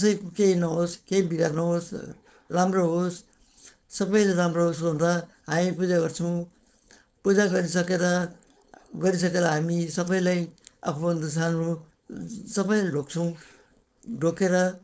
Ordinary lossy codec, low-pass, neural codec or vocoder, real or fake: none; none; codec, 16 kHz, 4.8 kbps, FACodec; fake